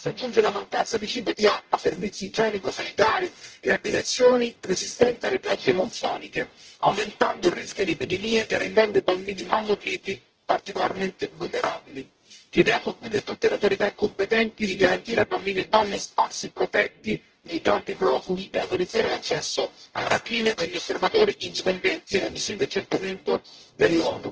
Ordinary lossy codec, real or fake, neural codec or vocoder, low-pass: Opus, 16 kbps; fake; codec, 44.1 kHz, 0.9 kbps, DAC; 7.2 kHz